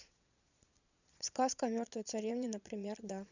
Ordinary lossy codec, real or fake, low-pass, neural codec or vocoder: none; fake; 7.2 kHz; vocoder, 22.05 kHz, 80 mel bands, Vocos